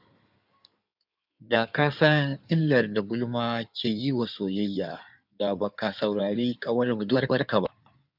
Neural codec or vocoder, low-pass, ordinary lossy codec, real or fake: codec, 16 kHz in and 24 kHz out, 2.2 kbps, FireRedTTS-2 codec; 5.4 kHz; none; fake